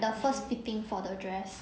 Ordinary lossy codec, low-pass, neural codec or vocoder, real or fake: none; none; none; real